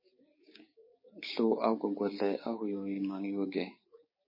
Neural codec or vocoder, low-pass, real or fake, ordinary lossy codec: codec, 16 kHz, 6 kbps, DAC; 5.4 kHz; fake; MP3, 24 kbps